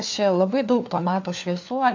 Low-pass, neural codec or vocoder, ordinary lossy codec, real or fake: 7.2 kHz; codec, 16 kHz, 4 kbps, FunCodec, trained on LibriTTS, 50 frames a second; AAC, 48 kbps; fake